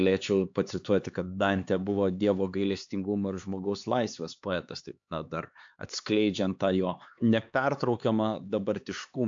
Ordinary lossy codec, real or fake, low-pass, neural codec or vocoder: AAC, 64 kbps; fake; 7.2 kHz; codec, 16 kHz, 4 kbps, X-Codec, HuBERT features, trained on LibriSpeech